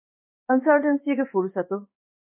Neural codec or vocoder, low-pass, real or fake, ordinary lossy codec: codec, 16 kHz in and 24 kHz out, 1 kbps, XY-Tokenizer; 3.6 kHz; fake; MP3, 24 kbps